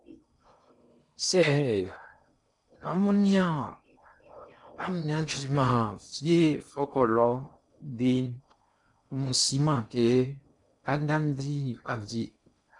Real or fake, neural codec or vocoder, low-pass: fake; codec, 16 kHz in and 24 kHz out, 0.6 kbps, FocalCodec, streaming, 4096 codes; 10.8 kHz